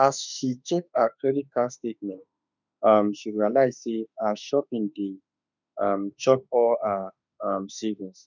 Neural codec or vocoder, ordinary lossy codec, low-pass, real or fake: autoencoder, 48 kHz, 32 numbers a frame, DAC-VAE, trained on Japanese speech; none; 7.2 kHz; fake